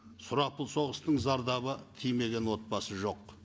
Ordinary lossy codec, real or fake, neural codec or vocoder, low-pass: none; real; none; none